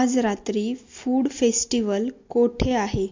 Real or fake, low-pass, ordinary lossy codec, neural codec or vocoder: real; 7.2 kHz; MP3, 48 kbps; none